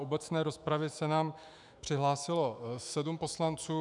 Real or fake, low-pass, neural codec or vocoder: fake; 10.8 kHz; autoencoder, 48 kHz, 128 numbers a frame, DAC-VAE, trained on Japanese speech